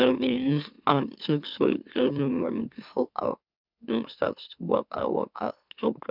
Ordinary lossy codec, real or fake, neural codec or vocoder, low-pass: none; fake; autoencoder, 44.1 kHz, a latent of 192 numbers a frame, MeloTTS; 5.4 kHz